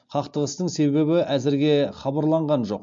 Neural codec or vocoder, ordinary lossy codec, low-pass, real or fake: none; MP3, 48 kbps; 7.2 kHz; real